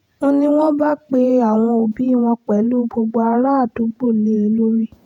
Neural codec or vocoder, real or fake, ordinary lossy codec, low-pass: vocoder, 48 kHz, 128 mel bands, Vocos; fake; none; 19.8 kHz